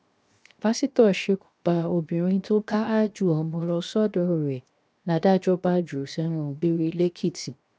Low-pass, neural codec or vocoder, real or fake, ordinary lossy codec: none; codec, 16 kHz, 0.7 kbps, FocalCodec; fake; none